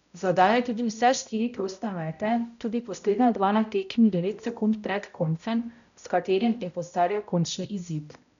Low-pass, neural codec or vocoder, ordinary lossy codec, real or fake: 7.2 kHz; codec, 16 kHz, 0.5 kbps, X-Codec, HuBERT features, trained on balanced general audio; none; fake